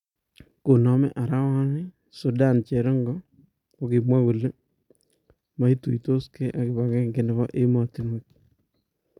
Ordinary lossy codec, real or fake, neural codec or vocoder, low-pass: none; real; none; 19.8 kHz